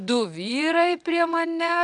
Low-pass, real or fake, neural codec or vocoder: 9.9 kHz; fake; vocoder, 22.05 kHz, 80 mel bands, WaveNeXt